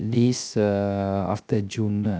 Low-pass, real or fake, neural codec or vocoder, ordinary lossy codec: none; fake; codec, 16 kHz, 0.3 kbps, FocalCodec; none